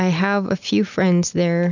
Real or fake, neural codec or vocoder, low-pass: real; none; 7.2 kHz